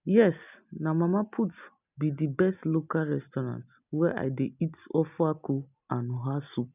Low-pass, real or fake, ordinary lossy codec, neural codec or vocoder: 3.6 kHz; real; none; none